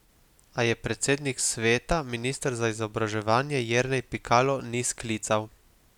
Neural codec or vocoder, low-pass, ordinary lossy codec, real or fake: none; 19.8 kHz; none; real